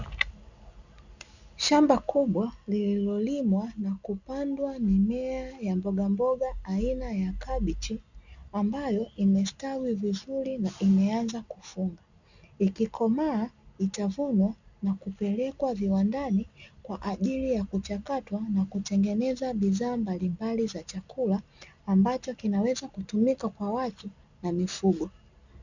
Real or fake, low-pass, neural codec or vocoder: real; 7.2 kHz; none